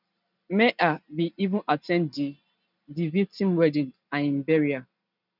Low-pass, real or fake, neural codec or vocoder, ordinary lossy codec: 5.4 kHz; real; none; none